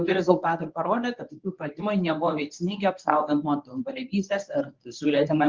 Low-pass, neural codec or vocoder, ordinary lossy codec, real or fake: 7.2 kHz; codec, 24 kHz, 0.9 kbps, WavTokenizer, medium speech release version 1; Opus, 32 kbps; fake